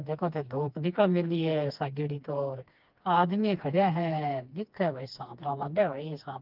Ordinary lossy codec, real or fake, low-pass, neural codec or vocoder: Opus, 24 kbps; fake; 5.4 kHz; codec, 16 kHz, 2 kbps, FreqCodec, smaller model